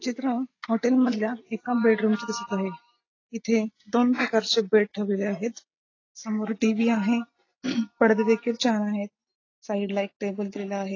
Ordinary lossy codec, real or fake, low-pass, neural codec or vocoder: AAC, 32 kbps; real; 7.2 kHz; none